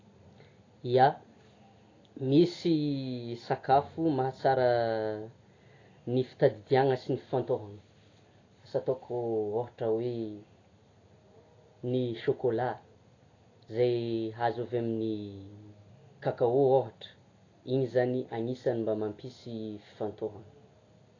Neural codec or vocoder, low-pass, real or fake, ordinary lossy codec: none; 7.2 kHz; real; AAC, 32 kbps